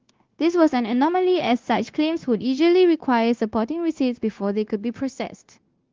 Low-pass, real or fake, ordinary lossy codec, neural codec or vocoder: 7.2 kHz; fake; Opus, 16 kbps; codec, 16 kHz, 0.9 kbps, LongCat-Audio-Codec